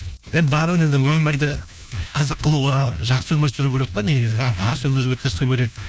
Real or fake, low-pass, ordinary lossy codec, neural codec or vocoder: fake; none; none; codec, 16 kHz, 1 kbps, FunCodec, trained on LibriTTS, 50 frames a second